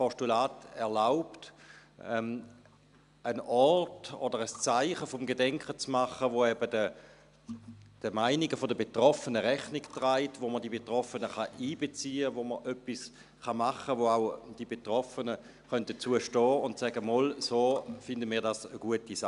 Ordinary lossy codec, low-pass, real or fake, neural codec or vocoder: none; 10.8 kHz; real; none